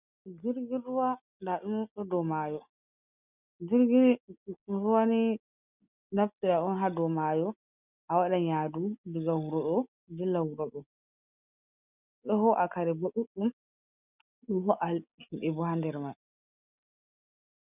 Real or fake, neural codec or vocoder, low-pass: real; none; 3.6 kHz